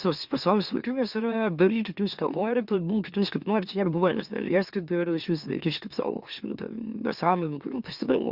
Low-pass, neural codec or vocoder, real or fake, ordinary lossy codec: 5.4 kHz; autoencoder, 44.1 kHz, a latent of 192 numbers a frame, MeloTTS; fake; Opus, 64 kbps